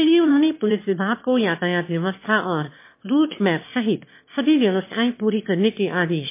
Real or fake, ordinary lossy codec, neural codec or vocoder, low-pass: fake; MP3, 24 kbps; autoencoder, 22.05 kHz, a latent of 192 numbers a frame, VITS, trained on one speaker; 3.6 kHz